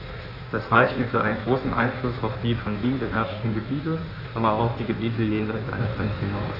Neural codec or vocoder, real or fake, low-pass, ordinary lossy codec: codec, 16 kHz in and 24 kHz out, 1.1 kbps, FireRedTTS-2 codec; fake; 5.4 kHz; none